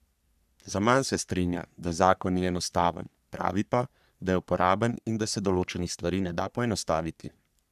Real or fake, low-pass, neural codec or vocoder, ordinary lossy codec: fake; 14.4 kHz; codec, 44.1 kHz, 3.4 kbps, Pupu-Codec; none